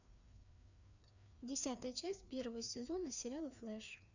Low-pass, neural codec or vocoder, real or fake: 7.2 kHz; codec, 16 kHz, 2 kbps, FreqCodec, larger model; fake